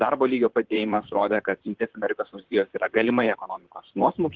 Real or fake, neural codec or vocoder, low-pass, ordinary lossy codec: fake; vocoder, 44.1 kHz, 128 mel bands, Pupu-Vocoder; 7.2 kHz; Opus, 16 kbps